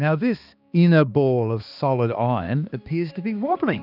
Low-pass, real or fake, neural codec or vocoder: 5.4 kHz; fake; codec, 16 kHz, 2 kbps, X-Codec, HuBERT features, trained on balanced general audio